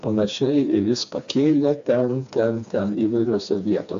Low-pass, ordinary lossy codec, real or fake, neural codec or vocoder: 7.2 kHz; AAC, 64 kbps; fake; codec, 16 kHz, 2 kbps, FreqCodec, smaller model